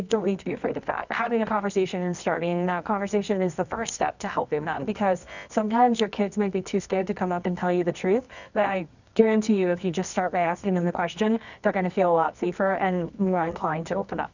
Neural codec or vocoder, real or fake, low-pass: codec, 24 kHz, 0.9 kbps, WavTokenizer, medium music audio release; fake; 7.2 kHz